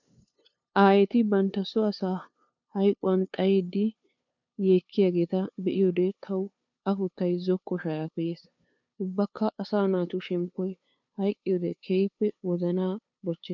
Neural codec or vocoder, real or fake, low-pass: codec, 16 kHz, 2 kbps, FunCodec, trained on LibriTTS, 25 frames a second; fake; 7.2 kHz